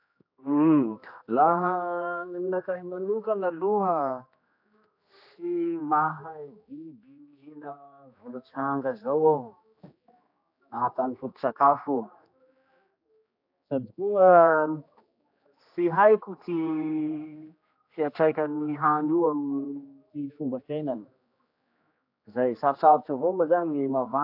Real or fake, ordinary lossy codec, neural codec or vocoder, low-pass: fake; AAC, 48 kbps; codec, 16 kHz, 2 kbps, X-Codec, HuBERT features, trained on general audio; 5.4 kHz